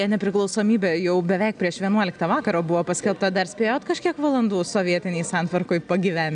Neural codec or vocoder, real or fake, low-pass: none; real; 9.9 kHz